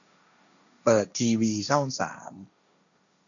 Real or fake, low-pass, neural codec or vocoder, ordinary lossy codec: fake; 7.2 kHz; codec, 16 kHz, 1.1 kbps, Voila-Tokenizer; none